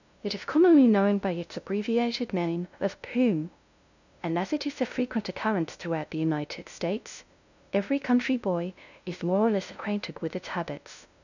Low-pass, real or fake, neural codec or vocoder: 7.2 kHz; fake; codec, 16 kHz, 0.5 kbps, FunCodec, trained on LibriTTS, 25 frames a second